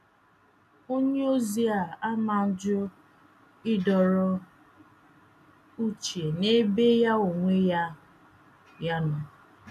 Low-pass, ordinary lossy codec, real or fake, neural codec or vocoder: 14.4 kHz; none; real; none